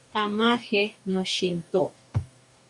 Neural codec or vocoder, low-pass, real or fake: codec, 44.1 kHz, 2.6 kbps, DAC; 10.8 kHz; fake